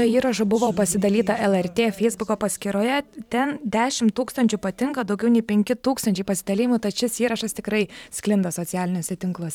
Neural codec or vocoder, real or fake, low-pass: vocoder, 44.1 kHz, 128 mel bands every 512 samples, BigVGAN v2; fake; 19.8 kHz